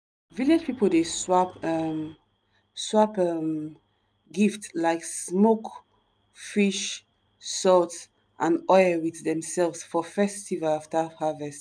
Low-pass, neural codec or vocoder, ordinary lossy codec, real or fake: none; none; none; real